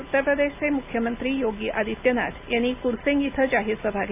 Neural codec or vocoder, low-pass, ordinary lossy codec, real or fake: none; 3.6 kHz; AAC, 32 kbps; real